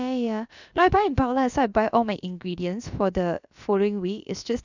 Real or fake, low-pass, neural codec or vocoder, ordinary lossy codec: fake; 7.2 kHz; codec, 16 kHz, about 1 kbps, DyCAST, with the encoder's durations; none